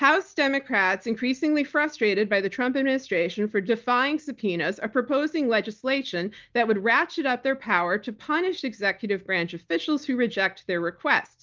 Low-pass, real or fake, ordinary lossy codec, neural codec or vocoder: 7.2 kHz; real; Opus, 32 kbps; none